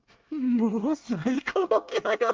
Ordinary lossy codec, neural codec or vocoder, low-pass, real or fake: Opus, 24 kbps; codec, 24 kHz, 1 kbps, SNAC; 7.2 kHz; fake